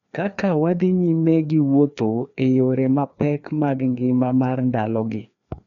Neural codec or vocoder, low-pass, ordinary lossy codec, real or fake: codec, 16 kHz, 2 kbps, FreqCodec, larger model; 7.2 kHz; none; fake